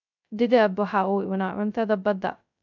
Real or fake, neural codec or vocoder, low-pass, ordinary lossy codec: fake; codec, 16 kHz, 0.2 kbps, FocalCodec; 7.2 kHz; none